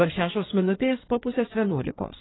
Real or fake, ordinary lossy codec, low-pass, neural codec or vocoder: fake; AAC, 16 kbps; 7.2 kHz; codec, 16 kHz in and 24 kHz out, 1.1 kbps, FireRedTTS-2 codec